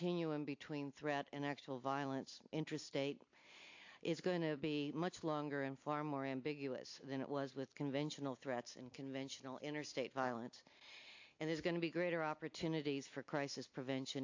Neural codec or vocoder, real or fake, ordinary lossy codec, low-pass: none; real; AAC, 48 kbps; 7.2 kHz